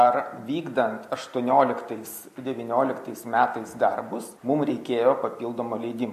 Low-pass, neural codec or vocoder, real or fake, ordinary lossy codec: 14.4 kHz; none; real; MP3, 64 kbps